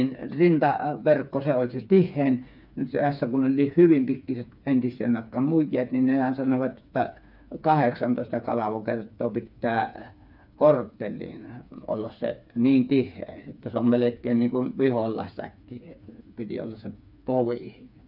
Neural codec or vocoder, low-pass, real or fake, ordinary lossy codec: codec, 16 kHz, 4 kbps, FreqCodec, smaller model; 5.4 kHz; fake; none